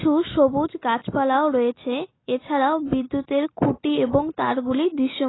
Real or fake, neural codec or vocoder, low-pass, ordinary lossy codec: real; none; 7.2 kHz; AAC, 16 kbps